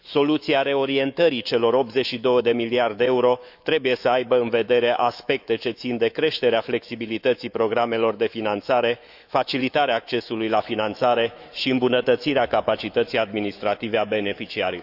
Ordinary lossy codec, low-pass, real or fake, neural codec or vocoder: none; 5.4 kHz; fake; autoencoder, 48 kHz, 128 numbers a frame, DAC-VAE, trained on Japanese speech